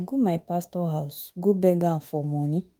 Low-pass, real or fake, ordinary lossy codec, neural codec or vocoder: 19.8 kHz; fake; Opus, 32 kbps; autoencoder, 48 kHz, 32 numbers a frame, DAC-VAE, trained on Japanese speech